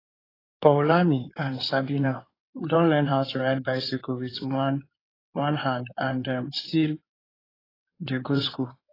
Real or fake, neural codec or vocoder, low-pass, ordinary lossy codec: fake; codec, 16 kHz in and 24 kHz out, 2.2 kbps, FireRedTTS-2 codec; 5.4 kHz; AAC, 24 kbps